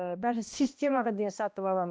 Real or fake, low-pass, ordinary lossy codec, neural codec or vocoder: fake; none; none; codec, 16 kHz, 1 kbps, X-Codec, HuBERT features, trained on balanced general audio